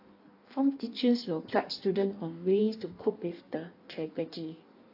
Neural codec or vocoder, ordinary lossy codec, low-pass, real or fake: codec, 16 kHz in and 24 kHz out, 1.1 kbps, FireRedTTS-2 codec; AAC, 32 kbps; 5.4 kHz; fake